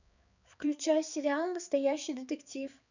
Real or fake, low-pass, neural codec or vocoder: fake; 7.2 kHz; codec, 16 kHz, 4 kbps, X-Codec, HuBERT features, trained on balanced general audio